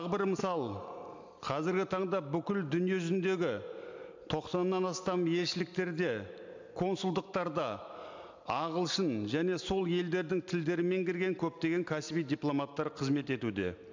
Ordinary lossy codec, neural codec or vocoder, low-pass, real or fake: MP3, 64 kbps; none; 7.2 kHz; real